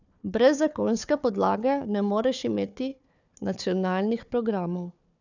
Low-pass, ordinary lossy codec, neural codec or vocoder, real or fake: 7.2 kHz; none; codec, 16 kHz, 4 kbps, FunCodec, trained on Chinese and English, 50 frames a second; fake